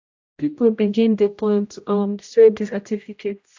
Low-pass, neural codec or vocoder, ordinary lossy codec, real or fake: 7.2 kHz; codec, 16 kHz, 0.5 kbps, X-Codec, HuBERT features, trained on general audio; AAC, 48 kbps; fake